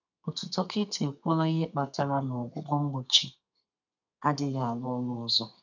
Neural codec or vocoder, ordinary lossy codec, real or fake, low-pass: codec, 32 kHz, 1.9 kbps, SNAC; none; fake; 7.2 kHz